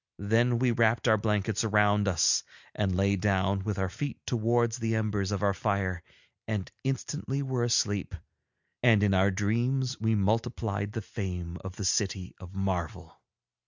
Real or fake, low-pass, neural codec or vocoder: real; 7.2 kHz; none